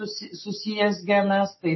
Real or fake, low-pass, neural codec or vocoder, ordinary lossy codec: real; 7.2 kHz; none; MP3, 24 kbps